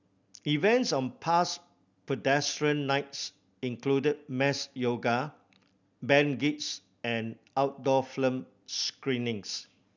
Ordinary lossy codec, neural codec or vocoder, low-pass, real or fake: none; none; 7.2 kHz; real